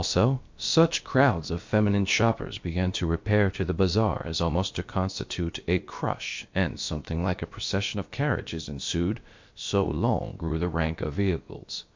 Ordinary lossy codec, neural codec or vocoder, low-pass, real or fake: MP3, 48 kbps; codec, 16 kHz, about 1 kbps, DyCAST, with the encoder's durations; 7.2 kHz; fake